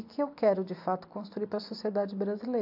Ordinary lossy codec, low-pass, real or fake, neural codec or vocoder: AAC, 48 kbps; 5.4 kHz; real; none